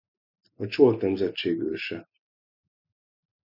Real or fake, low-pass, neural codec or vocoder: real; 5.4 kHz; none